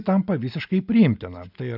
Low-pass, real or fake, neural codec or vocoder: 5.4 kHz; real; none